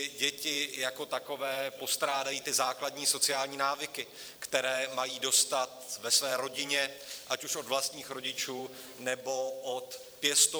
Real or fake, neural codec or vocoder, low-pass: fake; vocoder, 44.1 kHz, 128 mel bands every 512 samples, BigVGAN v2; 19.8 kHz